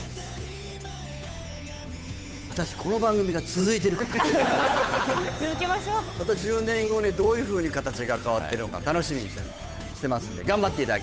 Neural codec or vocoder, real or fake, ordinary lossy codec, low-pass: codec, 16 kHz, 8 kbps, FunCodec, trained on Chinese and English, 25 frames a second; fake; none; none